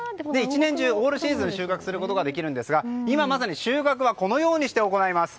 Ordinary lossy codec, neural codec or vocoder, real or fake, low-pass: none; none; real; none